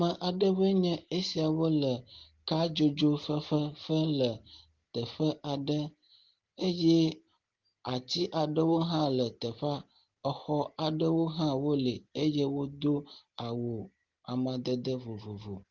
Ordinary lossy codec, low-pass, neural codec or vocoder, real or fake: Opus, 16 kbps; 7.2 kHz; none; real